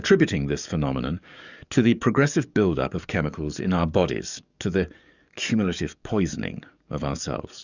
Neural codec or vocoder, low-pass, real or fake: codec, 44.1 kHz, 7.8 kbps, DAC; 7.2 kHz; fake